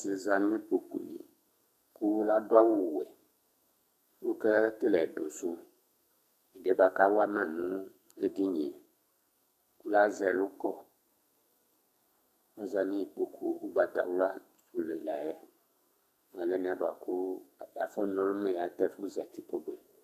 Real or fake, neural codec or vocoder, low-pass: fake; codec, 44.1 kHz, 2.6 kbps, SNAC; 14.4 kHz